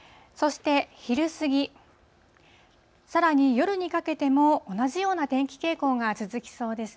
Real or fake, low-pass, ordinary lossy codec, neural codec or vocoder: real; none; none; none